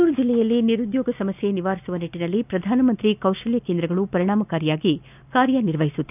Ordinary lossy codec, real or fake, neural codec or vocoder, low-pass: none; real; none; 3.6 kHz